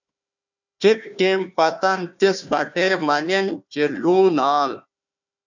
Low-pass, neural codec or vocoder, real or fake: 7.2 kHz; codec, 16 kHz, 1 kbps, FunCodec, trained on Chinese and English, 50 frames a second; fake